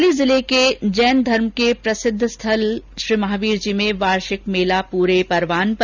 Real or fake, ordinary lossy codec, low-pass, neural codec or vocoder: real; none; 7.2 kHz; none